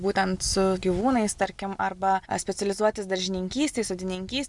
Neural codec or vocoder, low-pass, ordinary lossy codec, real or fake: none; 10.8 kHz; Opus, 64 kbps; real